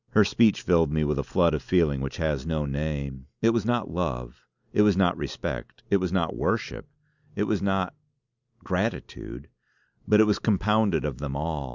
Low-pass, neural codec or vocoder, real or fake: 7.2 kHz; none; real